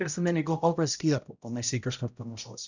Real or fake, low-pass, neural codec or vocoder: fake; 7.2 kHz; codec, 16 kHz, 0.5 kbps, X-Codec, HuBERT features, trained on balanced general audio